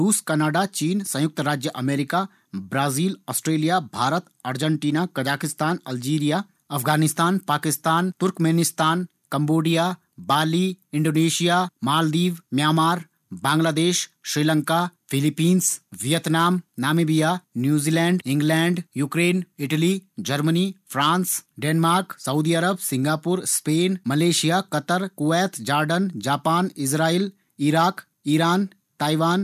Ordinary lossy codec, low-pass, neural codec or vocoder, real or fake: none; 14.4 kHz; none; real